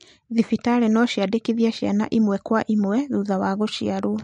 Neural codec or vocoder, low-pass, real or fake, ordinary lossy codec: none; 19.8 kHz; real; MP3, 48 kbps